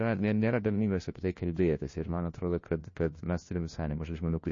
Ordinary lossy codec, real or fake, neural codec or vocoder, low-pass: MP3, 32 kbps; fake; codec, 16 kHz, 1 kbps, FunCodec, trained on LibriTTS, 50 frames a second; 7.2 kHz